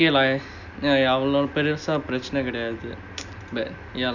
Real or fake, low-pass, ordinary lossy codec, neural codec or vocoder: real; 7.2 kHz; none; none